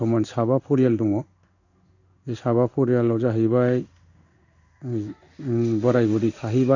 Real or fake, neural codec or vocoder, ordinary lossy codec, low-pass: fake; codec, 16 kHz in and 24 kHz out, 1 kbps, XY-Tokenizer; none; 7.2 kHz